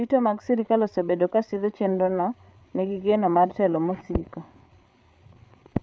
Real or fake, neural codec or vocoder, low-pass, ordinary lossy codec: fake; codec, 16 kHz, 8 kbps, FreqCodec, larger model; none; none